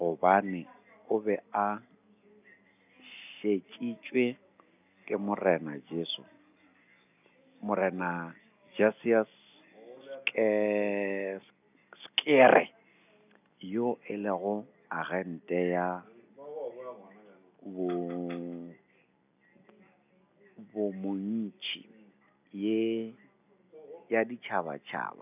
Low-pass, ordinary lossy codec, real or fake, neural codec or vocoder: 3.6 kHz; none; real; none